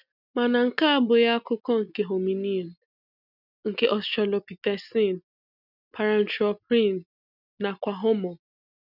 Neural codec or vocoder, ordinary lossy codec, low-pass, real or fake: none; none; 5.4 kHz; real